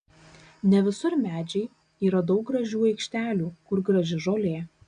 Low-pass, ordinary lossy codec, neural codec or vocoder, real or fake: 9.9 kHz; AAC, 96 kbps; none; real